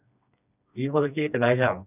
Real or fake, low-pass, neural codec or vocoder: fake; 3.6 kHz; codec, 16 kHz, 2 kbps, FreqCodec, smaller model